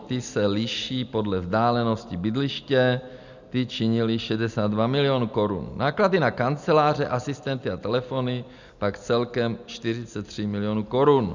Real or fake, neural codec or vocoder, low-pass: real; none; 7.2 kHz